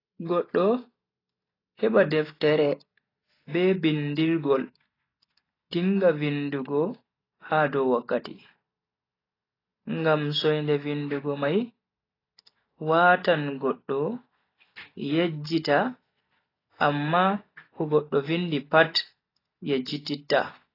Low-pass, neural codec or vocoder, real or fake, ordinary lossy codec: 5.4 kHz; none; real; AAC, 24 kbps